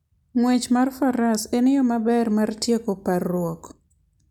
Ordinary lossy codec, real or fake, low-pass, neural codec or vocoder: none; real; 19.8 kHz; none